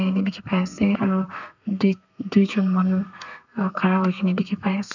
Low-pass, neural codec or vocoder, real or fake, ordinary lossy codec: 7.2 kHz; codec, 32 kHz, 1.9 kbps, SNAC; fake; none